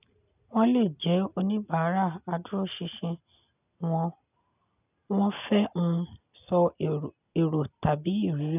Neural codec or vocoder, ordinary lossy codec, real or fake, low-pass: none; none; real; 3.6 kHz